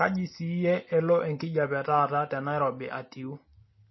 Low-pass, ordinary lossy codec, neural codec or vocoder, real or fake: 7.2 kHz; MP3, 24 kbps; none; real